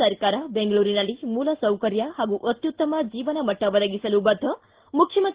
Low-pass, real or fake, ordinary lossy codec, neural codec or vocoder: 3.6 kHz; real; Opus, 16 kbps; none